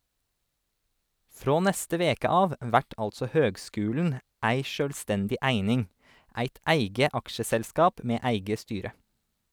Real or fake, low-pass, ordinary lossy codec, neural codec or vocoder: real; none; none; none